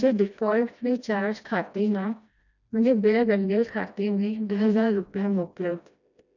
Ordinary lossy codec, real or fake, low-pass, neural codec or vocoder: none; fake; 7.2 kHz; codec, 16 kHz, 1 kbps, FreqCodec, smaller model